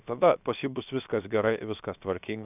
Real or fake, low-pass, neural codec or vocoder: fake; 3.6 kHz; codec, 16 kHz, 0.7 kbps, FocalCodec